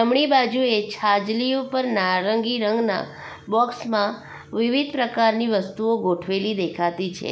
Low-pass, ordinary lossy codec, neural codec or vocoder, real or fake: none; none; none; real